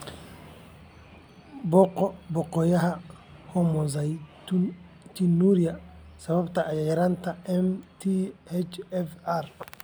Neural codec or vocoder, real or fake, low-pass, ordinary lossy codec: none; real; none; none